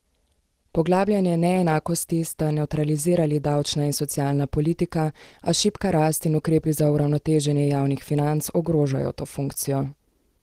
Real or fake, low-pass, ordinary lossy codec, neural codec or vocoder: real; 14.4 kHz; Opus, 16 kbps; none